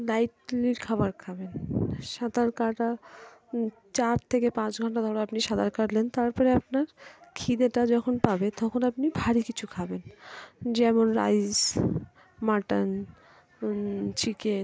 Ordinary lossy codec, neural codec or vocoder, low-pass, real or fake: none; none; none; real